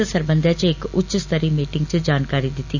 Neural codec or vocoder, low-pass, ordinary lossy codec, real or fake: none; 7.2 kHz; none; real